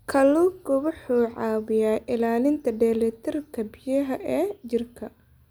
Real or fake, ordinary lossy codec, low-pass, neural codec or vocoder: real; none; none; none